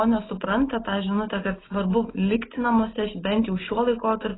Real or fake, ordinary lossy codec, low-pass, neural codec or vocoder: real; AAC, 16 kbps; 7.2 kHz; none